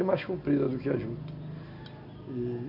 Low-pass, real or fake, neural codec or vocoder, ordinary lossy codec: 5.4 kHz; real; none; none